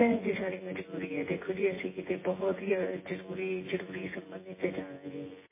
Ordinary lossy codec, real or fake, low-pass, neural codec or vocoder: MP3, 16 kbps; fake; 3.6 kHz; vocoder, 24 kHz, 100 mel bands, Vocos